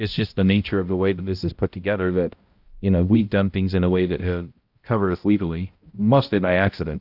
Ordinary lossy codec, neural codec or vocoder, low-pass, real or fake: Opus, 32 kbps; codec, 16 kHz, 0.5 kbps, X-Codec, HuBERT features, trained on balanced general audio; 5.4 kHz; fake